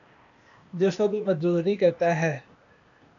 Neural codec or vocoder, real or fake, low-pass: codec, 16 kHz, 0.8 kbps, ZipCodec; fake; 7.2 kHz